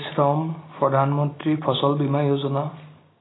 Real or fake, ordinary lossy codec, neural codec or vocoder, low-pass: real; AAC, 16 kbps; none; 7.2 kHz